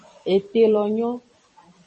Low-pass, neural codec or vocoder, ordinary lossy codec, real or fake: 10.8 kHz; vocoder, 44.1 kHz, 128 mel bands every 256 samples, BigVGAN v2; MP3, 32 kbps; fake